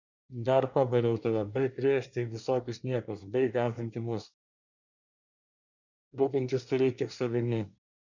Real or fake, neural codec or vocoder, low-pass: fake; codec, 24 kHz, 1 kbps, SNAC; 7.2 kHz